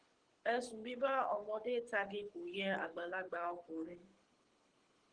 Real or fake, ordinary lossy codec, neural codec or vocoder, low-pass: fake; Opus, 16 kbps; codec, 24 kHz, 6 kbps, HILCodec; 9.9 kHz